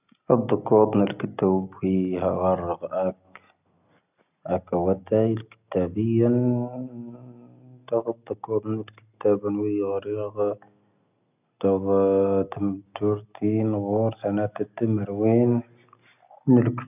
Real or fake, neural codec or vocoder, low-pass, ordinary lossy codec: real; none; 3.6 kHz; none